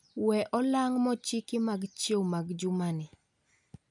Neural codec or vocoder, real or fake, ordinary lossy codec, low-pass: none; real; none; 10.8 kHz